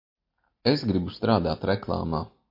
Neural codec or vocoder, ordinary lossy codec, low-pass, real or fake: none; MP3, 32 kbps; 5.4 kHz; real